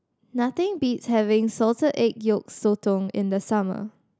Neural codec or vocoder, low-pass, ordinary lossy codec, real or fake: none; none; none; real